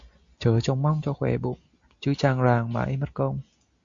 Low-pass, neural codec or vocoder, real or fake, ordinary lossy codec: 7.2 kHz; none; real; Opus, 64 kbps